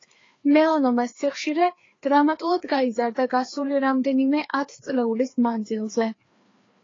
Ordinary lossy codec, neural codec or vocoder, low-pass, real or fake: AAC, 32 kbps; codec, 16 kHz, 2 kbps, FreqCodec, larger model; 7.2 kHz; fake